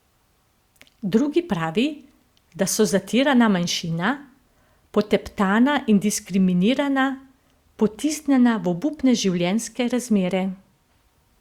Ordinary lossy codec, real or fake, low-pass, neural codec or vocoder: Opus, 64 kbps; real; 19.8 kHz; none